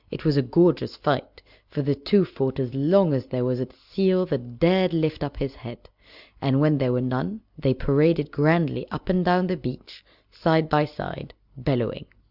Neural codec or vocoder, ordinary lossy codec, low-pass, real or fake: none; Opus, 64 kbps; 5.4 kHz; real